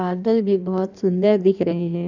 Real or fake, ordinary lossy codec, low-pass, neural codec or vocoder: fake; none; 7.2 kHz; codec, 16 kHz in and 24 kHz out, 1.1 kbps, FireRedTTS-2 codec